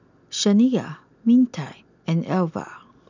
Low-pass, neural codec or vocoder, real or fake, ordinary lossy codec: 7.2 kHz; none; real; none